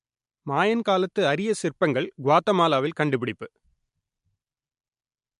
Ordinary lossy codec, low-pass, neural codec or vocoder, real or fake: MP3, 64 kbps; 10.8 kHz; none; real